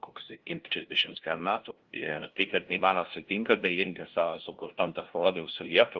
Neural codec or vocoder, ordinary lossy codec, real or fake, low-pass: codec, 16 kHz, 0.5 kbps, FunCodec, trained on LibriTTS, 25 frames a second; Opus, 16 kbps; fake; 7.2 kHz